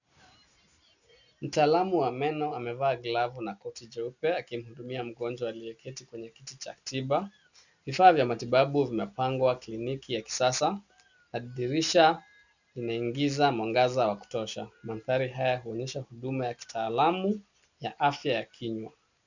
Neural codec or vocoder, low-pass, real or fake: none; 7.2 kHz; real